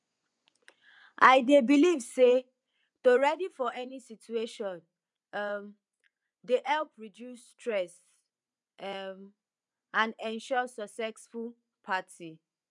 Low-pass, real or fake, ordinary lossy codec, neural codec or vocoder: 10.8 kHz; fake; none; vocoder, 24 kHz, 100 mel bands, Vocos